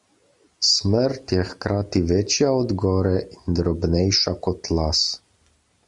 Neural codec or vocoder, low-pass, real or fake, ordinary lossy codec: none; 10.8 kHz; real; MP3, 96 kbps